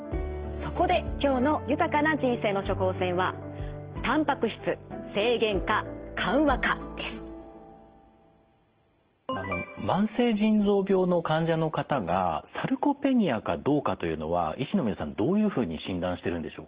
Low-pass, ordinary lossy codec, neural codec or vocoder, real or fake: 3.6 kHz; Opus, 16 kbps; none; real